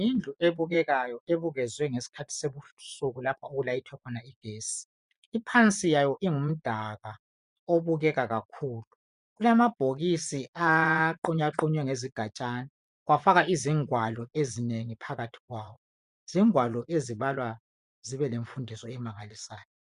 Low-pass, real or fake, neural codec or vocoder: 10.8 kHz; fake; vocoder, 24 kHz, 100 mel bands, Vocos